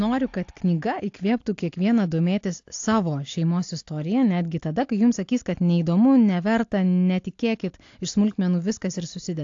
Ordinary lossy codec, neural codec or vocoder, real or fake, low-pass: AAC, 48 kbps; none; real; 7.2 kHz